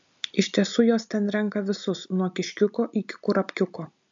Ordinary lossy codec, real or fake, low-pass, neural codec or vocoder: MP3, 64 kbps; real; 7.2 kHz; none